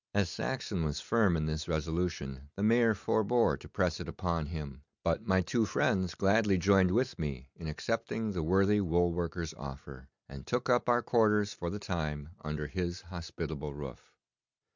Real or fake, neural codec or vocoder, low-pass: real; none; 7.2 kHz